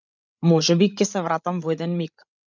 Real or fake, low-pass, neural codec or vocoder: fake; 7.2 kHz; codec, 16 kHz, 16 kbps, FreqCodec, larger model